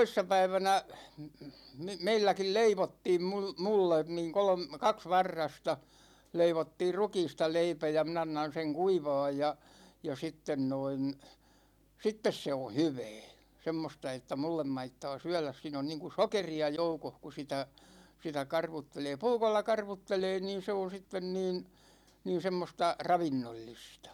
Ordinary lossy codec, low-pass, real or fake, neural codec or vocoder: none; 19.8 kHz; real; none